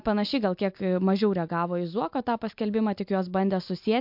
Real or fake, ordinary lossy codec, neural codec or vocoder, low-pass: real; MP3, 48 kbps; none; 5.4 kHz